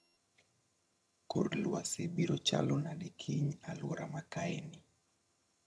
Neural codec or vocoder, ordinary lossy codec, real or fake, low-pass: vocoder, 22.05 kHz, 80 mel bands, HiFi-GAN; none; fake; none